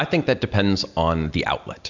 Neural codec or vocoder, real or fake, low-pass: none; real; 7.2 kHz